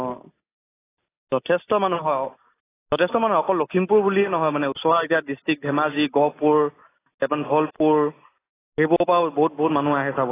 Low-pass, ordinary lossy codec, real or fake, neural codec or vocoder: 3.6 kHz; AAC, 16 kbps; real; none